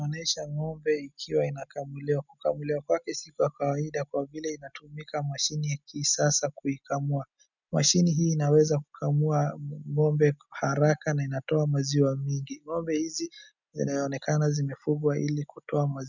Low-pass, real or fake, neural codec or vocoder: 7.2 kHz; real; none